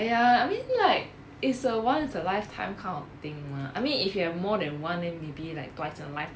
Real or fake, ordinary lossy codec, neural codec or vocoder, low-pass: real; none; none; none